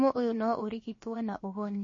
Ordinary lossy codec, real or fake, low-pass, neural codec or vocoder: MP3, 32 kbps; fake; 7.2 kHz; codec, 16 kHz, about 1 kbps, DyCAST, with the encoder's durations